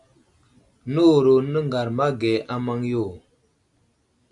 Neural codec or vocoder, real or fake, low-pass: none; real; 10.8 kHz